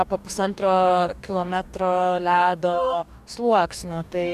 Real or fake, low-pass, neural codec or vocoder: fake; 14.4 kHz; codec, 44.1 kHz, 2.6 kbps, DAC